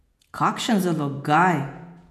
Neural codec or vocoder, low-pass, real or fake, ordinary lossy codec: vocoder, 48 kHz, 128 mel bands, Vocos; 14.4 kHz; fake; none